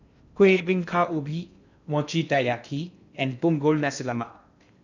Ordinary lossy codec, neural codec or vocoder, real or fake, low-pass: none; codec, 16 kHz in and 24 kHz out, 0.6 kbps, FocalCodec, streaming, 2048 codes; fake; 7.2 kHz